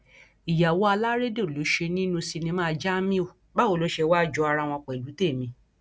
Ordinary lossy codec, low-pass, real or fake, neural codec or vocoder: none; none; real; none